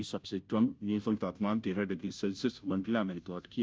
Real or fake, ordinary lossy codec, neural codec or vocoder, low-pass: fake; none; codec, 16 kHz, 0.5 kbps, FunCodec, trained on Chinese and English, 25 frames a second; none